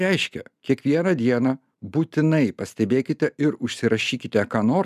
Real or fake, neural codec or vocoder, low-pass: real; none; 14.4 kHz